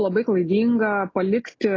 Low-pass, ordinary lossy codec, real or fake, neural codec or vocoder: 7.2 kHz; AAC, 32 kbps; real; none